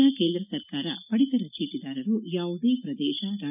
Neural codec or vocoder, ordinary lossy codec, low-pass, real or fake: none; none; 3.6 kHz; real